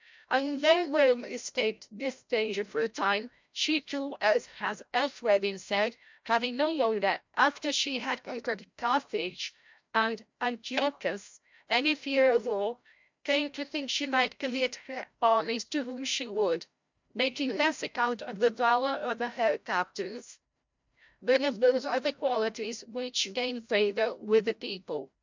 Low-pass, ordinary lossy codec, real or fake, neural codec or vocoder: 7.2 kHz; MP3, 64 kbps; fake; codec, 16 kHz, 0.5 kbps, FreqCodec, larger model